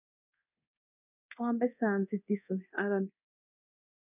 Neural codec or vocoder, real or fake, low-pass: codec, 24 kHz, 0.9 kbps, DualCodec; fake; 3.6 kHz